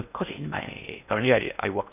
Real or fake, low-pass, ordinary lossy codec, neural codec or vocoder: fake; 3.6 kHz; none; codec, 16 kHz in and 24 kHz out, 0.6 kbps, FocalCodec, streaming, 4096 codes